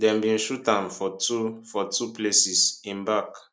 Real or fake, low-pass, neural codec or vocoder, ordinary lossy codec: real; none; none; none